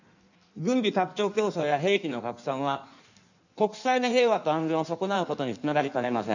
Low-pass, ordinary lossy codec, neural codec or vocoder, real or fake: 7.2 kHz; none; codec, 16 kHz in and 24 kHz out, 1.1 kbps, FireRedTTS-2 codec; fake